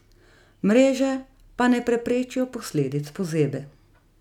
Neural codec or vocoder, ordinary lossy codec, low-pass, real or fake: none; none; 19.8 kHz; real